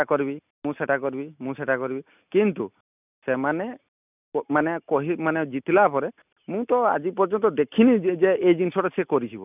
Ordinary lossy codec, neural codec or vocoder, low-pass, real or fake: none; none; 3.6 kHz; real